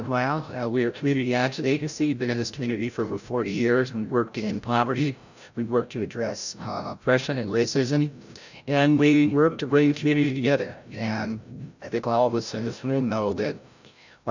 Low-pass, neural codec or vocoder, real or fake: 7.2 kHz; codec, 16 kHz, 0.5 kbps, FreqCodec, larger model; fake